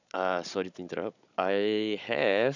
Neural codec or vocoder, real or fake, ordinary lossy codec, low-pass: codec, 16 kHz, 16 kbps, FunCodec, trained on Chinese and English, 50 frames a second; fake; none; 7.2 kHz